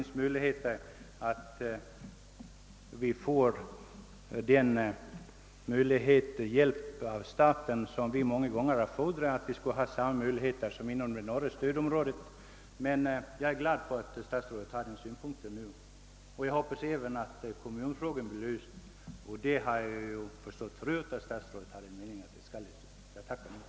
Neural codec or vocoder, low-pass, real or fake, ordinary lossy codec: none; none; real; none